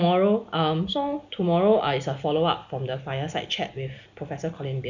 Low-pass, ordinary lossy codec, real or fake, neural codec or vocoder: 7.2 kHz; none; real; none